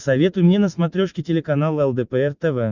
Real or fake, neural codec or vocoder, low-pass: real; none; 7.2 kHz